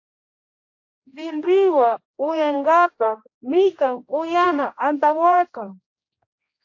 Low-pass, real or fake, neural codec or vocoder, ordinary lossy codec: 7.2 kHz; fake; codec, 16 kHz, 1 kbps, X-Codec, HuBERT features, trained on general audio; AAC, 48 kbps